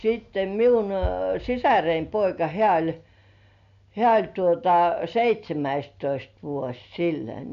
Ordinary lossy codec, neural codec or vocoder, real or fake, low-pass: none; none; real; 7.2 kHz